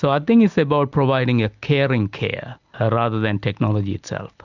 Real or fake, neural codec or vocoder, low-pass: real; none; 7.2 kHz